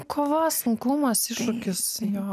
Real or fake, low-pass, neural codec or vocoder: real; 14.4 kHz; none